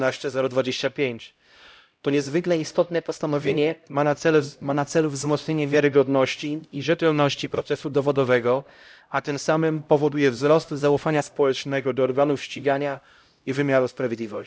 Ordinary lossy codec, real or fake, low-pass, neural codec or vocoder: none; fake; none; codec, 16 kHz, 0.5 kbps, X-Codec, HuBERT features, trained on LibriSpeech